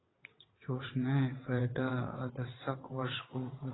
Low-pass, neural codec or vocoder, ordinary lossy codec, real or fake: 7.2 kHz; vocoder, 22.05 kHz, 80 mel bands, WaveNeXt; AAC, 16 kbps; fake